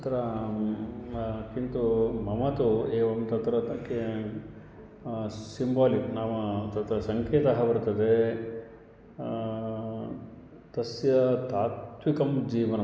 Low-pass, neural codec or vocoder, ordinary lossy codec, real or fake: none; none; none; real